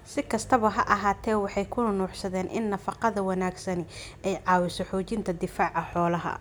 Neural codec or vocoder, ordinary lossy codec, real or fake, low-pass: none; none; real; none